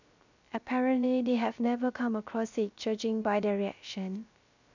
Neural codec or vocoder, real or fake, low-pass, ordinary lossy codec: codec, 16 kHz, 0.3 kbps, FocalCodec; fake; 7.2 kHz; none